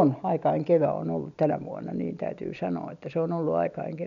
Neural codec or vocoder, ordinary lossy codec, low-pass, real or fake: none; none; 7.2 kHz; real